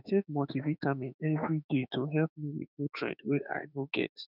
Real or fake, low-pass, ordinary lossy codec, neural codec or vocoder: fake; 5.4 kHz; none; autoencoder, 48 kHz, 32 numbers a frame, DAC-VAE, trained on Japanese speech